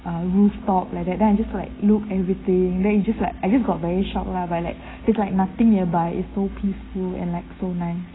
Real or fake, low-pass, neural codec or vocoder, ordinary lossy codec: real; 7.2 kHz; none; AAC, 16 kbps